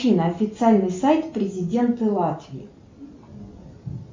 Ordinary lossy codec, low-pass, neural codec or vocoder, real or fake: MP3, 64 kbps; 7.2 kHz; none; real